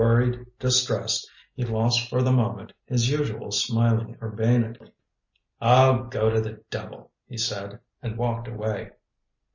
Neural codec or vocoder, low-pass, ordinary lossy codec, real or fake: none; 7.2 kHz; MP3, 32 kbps; real